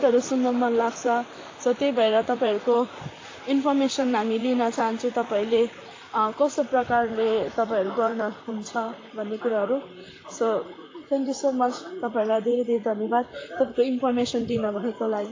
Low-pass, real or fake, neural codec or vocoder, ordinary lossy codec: 7.2 kHz; fake; vocoder, 44.1 kHz, 128 mel bands, Pupu-Vocoder; AAC, 32 kbps